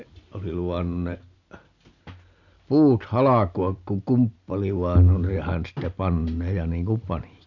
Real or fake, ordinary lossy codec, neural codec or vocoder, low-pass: real; none; none; 7.2 kHz